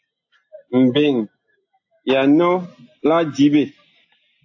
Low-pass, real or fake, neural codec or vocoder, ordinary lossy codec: 7.2 kHz; real; none; AAC, 48 kbps